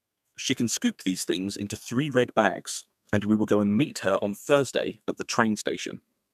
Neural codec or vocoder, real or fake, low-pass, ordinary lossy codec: codec, 32 kHz, 1.9 kbps, SNAC; fake; 14.4 kHz; none